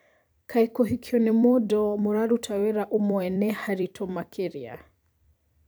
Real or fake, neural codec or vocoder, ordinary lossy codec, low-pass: fake; vocoder, 44.1 kHz, 128 mel bands every 256 samples, BigVGAN v2; none; none